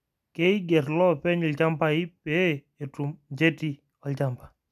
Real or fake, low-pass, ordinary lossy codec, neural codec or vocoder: real; 14.4 kHz; none; none